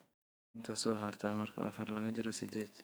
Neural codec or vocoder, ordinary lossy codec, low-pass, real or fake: codec, 44.1 kHz, 2.6 kbps, SNAC; none; none; fake